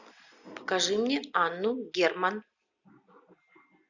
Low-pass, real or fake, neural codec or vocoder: 7.2 kHz; real; none